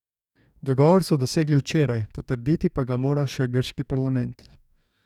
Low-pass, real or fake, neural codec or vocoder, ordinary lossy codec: 19.8 kHz; fake; codec, 44.1 kHz, 2.6 kbps, DAC; none